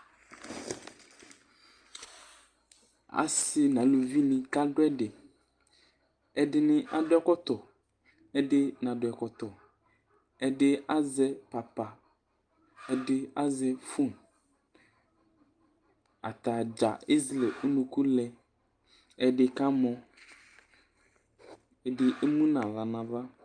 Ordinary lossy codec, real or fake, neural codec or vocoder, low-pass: Opus, 32 kbps; real; none; 9.9 kHz